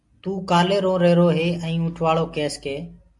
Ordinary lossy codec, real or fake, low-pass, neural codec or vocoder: MP3, 64 kbps; real; 10.8 kHz; none